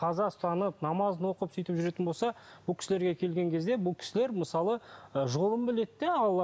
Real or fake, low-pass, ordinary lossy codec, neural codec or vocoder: real; none; none; none